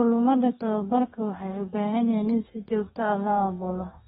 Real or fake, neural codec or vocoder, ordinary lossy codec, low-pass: fake; codec, 32 kHz, 1.9 kbps, SNAC; AAC, 16 kbps; 14.4 kHz